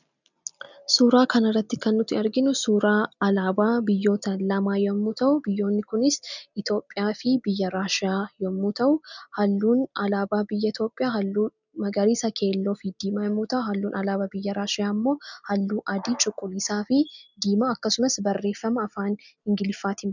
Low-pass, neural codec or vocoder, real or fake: 7.2 kHz; none; real